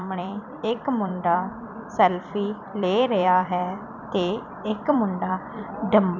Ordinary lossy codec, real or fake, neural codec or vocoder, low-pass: none; fake; vocoder, 44.1 kHz, 128 mel bands every 256 samples, BigVGAN v2; 7.2 kHz